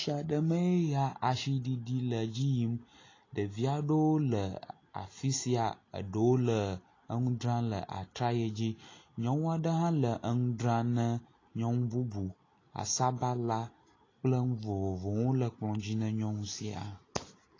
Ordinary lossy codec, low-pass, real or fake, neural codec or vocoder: AAC, 32 kbps; 7.2 kHz; real; none